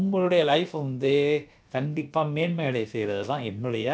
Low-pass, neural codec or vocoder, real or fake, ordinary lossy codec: none; codec, 16 kHz, about 1 kbps, DyCAST, with the encoder's durations; fake; none